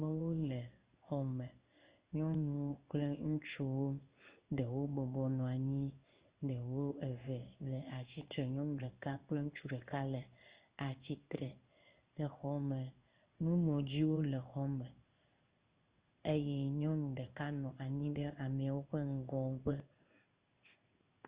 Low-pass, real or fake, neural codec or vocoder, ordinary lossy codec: 3.6 kHz; fake; codec, 16 kHz in and 24 kHz out, 1 kbps, XY-Tokenizer; Opus, 32 kbps